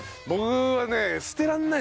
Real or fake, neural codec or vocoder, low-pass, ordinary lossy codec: real; none; none; none